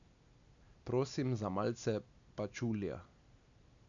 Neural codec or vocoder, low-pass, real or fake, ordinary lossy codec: none; 7.2 kHz; real; none